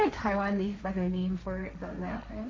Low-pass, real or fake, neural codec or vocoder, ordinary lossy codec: none; fake; codec, 16 kHz, 1.1 kbps, Voila-Tokenizer; none